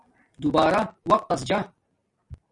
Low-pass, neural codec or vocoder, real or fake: 10.8 kHz; none; real